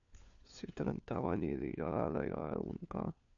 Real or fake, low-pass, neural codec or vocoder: fake; 7.2 kHz; codec, 16 kHz, 4 kbps, FunCodec, trained on LibriTTS, 50 frames a second